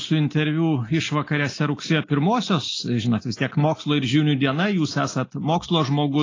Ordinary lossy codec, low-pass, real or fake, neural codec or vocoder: AAC, 32 kbps; 7.2 kHz; real; none